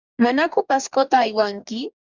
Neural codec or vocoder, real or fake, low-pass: codec, 44.1 kHz, 2.6 kbps, DAC; fake; 7.2 kHz